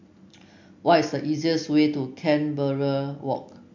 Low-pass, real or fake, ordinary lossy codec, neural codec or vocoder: 7.2 kHz; real; MP3, 48 kbps; none